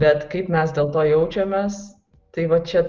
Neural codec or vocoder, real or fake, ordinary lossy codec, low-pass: none; real; Opus, 32 kbps; 7.2 kHz